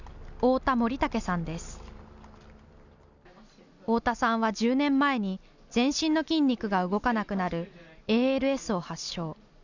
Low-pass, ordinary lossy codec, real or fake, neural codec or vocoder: 7.2 kHz; none; real; none